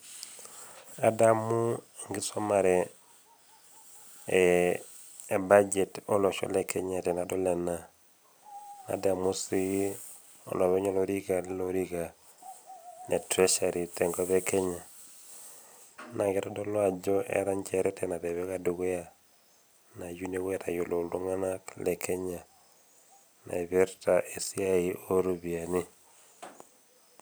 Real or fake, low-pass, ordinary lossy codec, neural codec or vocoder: real; none; none; none